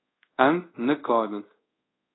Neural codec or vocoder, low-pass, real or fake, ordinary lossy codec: codec, 24 kHz, 0.5 kbps, DualCodec; 7.2 kHz; fake; AAC, 16 kbps